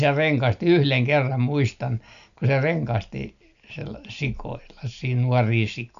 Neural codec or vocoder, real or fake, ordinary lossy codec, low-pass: none; real; none; 7.2 kHz